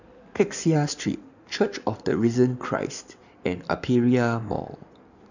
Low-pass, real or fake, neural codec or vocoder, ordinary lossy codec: 7.2 kHz; fake; codec, 16 kHz in and 24 kHz out, 2.2 kbps, FireRedTTS-2 codec; none